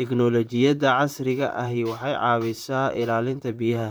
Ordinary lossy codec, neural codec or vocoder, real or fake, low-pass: none; vocoder, 44.1 kHz, 128 mel bands, Pupu-Vocoder; fake; none